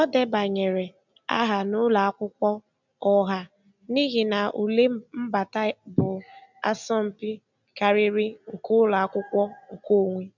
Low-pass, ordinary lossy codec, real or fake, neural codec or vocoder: 7.2 kHz; none; real; none